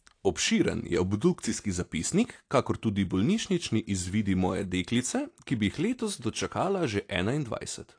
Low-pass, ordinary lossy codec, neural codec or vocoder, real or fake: 9.9 kHz; AAC, 48 kbps; none; real